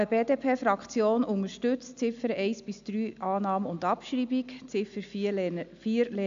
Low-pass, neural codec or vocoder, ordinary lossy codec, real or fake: 7.2 kHz; none; AAC, 64 kbps; real